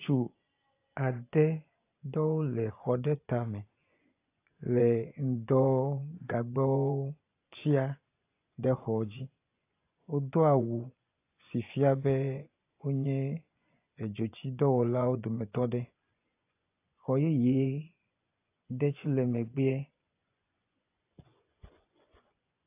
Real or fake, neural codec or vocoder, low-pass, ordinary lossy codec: fake; vocoder, 24 kHz, 100 mel bands, Vocos; 3.6 kHz; AAC, 24 kbps